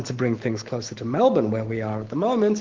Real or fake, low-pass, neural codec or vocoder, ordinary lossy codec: fake; 7.2 kHz; autoencoder, 48 kHz, 128 numbers a frame, DAC-VAE, trained on Japanese speech; Opus, 16 kbps